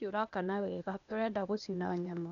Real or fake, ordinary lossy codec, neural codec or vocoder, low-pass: fake; none; codec, 16 kHz, 0.8 kbps, ZipCodec; 7.2 kHz